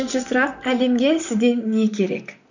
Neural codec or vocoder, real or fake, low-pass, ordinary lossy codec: vocoder, 44.1 kHz, 128 mel bands, Pupu-Vocoder; fake; 7.2 kHz; none